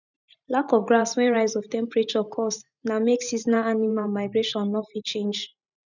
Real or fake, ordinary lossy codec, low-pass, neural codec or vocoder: fake; none; 7.2 kHz; vocoder, 44.1 kHz, 128 mel bands every 512 samples, BigVGAN v2